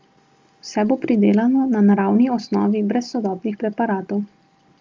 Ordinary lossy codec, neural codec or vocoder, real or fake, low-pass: Opus, 32 kbps; none; real; 7.2 kHz